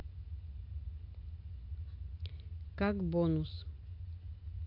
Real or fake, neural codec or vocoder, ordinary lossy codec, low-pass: real; none; AAC, 32 kbps; 5.4 kHz